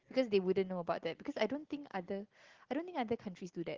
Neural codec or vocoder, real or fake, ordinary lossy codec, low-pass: none; real; Opus, 16 kbps; 7.2 kHz